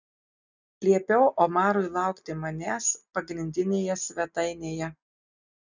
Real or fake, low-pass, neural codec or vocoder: real; 7.2 kHz; none